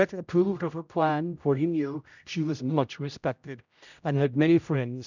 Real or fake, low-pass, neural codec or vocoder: fake; 7.2 kHz; codec, 16 kHz, 0.5 kbps, X-Codec, HuBERT features, trained on general audio